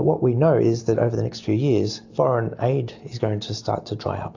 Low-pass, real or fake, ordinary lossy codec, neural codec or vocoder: 7.2 kHz; real; AAC, 48 kbps; none